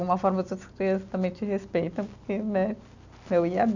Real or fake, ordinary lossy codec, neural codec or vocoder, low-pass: real; none; none; 7.2 kHz